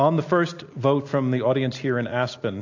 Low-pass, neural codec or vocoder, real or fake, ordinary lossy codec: 7.2 kHz; none; real; AAC, 48 kbps